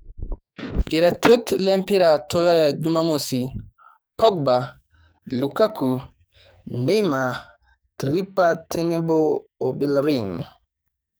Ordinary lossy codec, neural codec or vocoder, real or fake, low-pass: none; codec, 44.1 kHz, 2.6 kbps, SNAC; fake; none